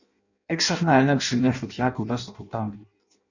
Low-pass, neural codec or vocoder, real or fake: 7.2 kHz; codec, 16 kHz in and 24 kHz out, 0.6 kbps, FireRedTTS-2 codec; fake